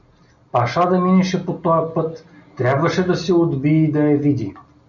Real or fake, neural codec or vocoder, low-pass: real; none; 7.2 kHz